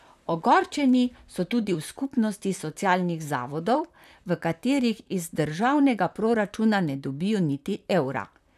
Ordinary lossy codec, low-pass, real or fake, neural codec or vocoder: none; 14.4 kHz; fake; vocoder, 44.1 kHz, 128 mel bands, Pupu-Vocoder